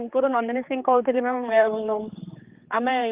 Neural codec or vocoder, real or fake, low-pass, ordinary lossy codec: codec, 16 kHz, 4 kbps, X-Codec, HuBERT features, trained on general audio; fake; 3.6 kHz; Opus, 32 kbps